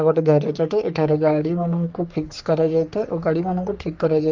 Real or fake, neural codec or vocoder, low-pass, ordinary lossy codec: fake; codec, 44.1 kHz, 3.4 kbps, Pupu-Codec; 7.2 kHz; Opus, 32 kbps